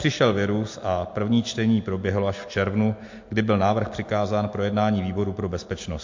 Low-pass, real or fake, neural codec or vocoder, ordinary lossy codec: 7.2 kHz; real; none; MP3, 48 kbps